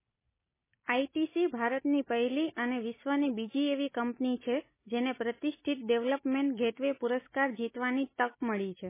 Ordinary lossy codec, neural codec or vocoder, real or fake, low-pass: MP3, 16 kbps; none; real; 3.6 kHz